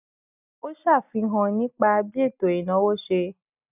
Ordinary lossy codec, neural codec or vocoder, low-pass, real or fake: none; none; 3.6 kHz; real